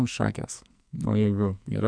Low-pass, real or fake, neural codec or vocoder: 9.9 kHz; fake; codec, 44.1 kHz, 2.6 kbps, SNAC